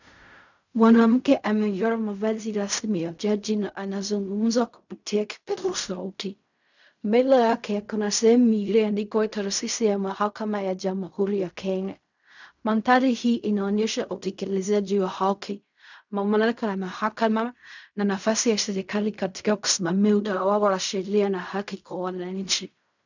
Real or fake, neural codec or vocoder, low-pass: fake; codec, 16 kHz in and 24 kHz out, 0.4 kbps, LongCat-Audio-Codec, fine tuned four codebook decoder; 7.2 kHz